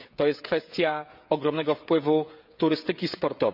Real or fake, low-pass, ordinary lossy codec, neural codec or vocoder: fake; 5.4 kHz; none; codec, 16 kHz, 16 kbps, FreqCodec, smaller model